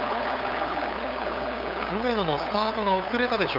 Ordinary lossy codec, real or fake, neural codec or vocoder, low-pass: none; fake; codec, 16 kHz, 8 kbps, FunCodec, trained on LibriTTS, 25 frames a second; 5.4 kHz